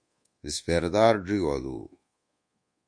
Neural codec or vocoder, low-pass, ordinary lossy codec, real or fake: codec, 24 kHz, 1.2 kbps, DualCodec; 9.9 kHz; MP3, 48 kbps; fake